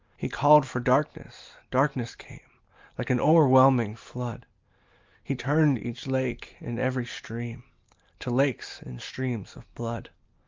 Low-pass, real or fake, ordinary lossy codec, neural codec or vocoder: 7.2 kHz; real; Opus, 24 kbps; none